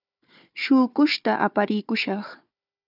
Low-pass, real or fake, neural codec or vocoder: 5.4 kHz; fake; codec, 16 kHz, 4 kbps, FunCodec, trained on Chinese and English, 50 frames a second